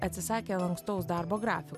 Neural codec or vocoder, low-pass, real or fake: none; 14.4 kHz; real